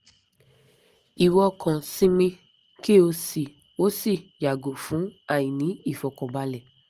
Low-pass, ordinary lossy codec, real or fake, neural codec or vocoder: 14.4 kHz; Opus, 24 kbps; real; none